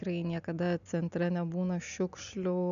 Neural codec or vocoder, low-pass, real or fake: none; 7.2 kHz; real